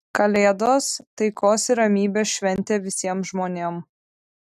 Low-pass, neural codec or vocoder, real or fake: 14.4 kHz; none; real